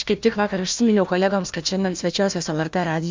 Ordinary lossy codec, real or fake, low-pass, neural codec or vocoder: MP3, 64 kbps; fake; 7.2 kHz; codec, 16 kHz, 1 kbps, FunCodec, trained on Chinese and English, 50 frames a second